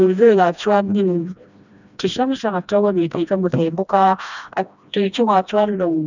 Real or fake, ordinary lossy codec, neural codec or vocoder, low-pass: fake; none; codec, 16 kHz, 1 kbps, FreqCodec, smaller model; 7.2 kHz